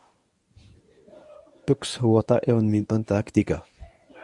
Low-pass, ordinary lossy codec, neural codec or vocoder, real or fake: 10.8 kHz; Opus, 64 kbps; codec, 24 kHz, 0.9 kbps, WavTokenizer, medium speech release version 1; fake